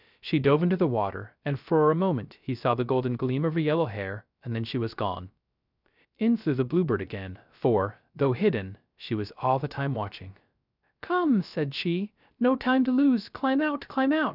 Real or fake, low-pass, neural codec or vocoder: fake; 5.4 kHz; codec, 16 kHz, 0.3 kbps, FocalCodec